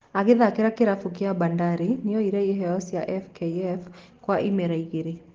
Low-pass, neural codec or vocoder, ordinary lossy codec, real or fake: 7.2 kHz; none; Opus, 16 kbps; real